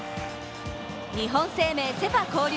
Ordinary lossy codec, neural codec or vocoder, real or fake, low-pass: none; none; real; none